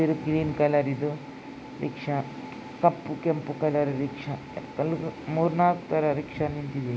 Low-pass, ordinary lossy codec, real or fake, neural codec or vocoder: none; none; real; none